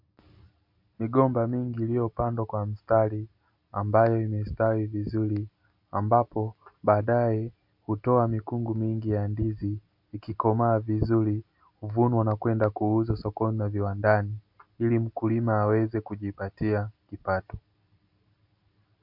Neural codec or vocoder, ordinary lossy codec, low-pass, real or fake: none; MP3, 48 kbps; 5.4 kHz; real